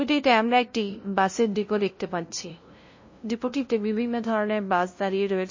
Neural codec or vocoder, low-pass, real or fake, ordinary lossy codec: codec, 16 kHz, 0.5 kbps, FunCodec, trained on LibriTTS, 25 frames a second; 7.2 kHz; fake; MP3, 32 kbps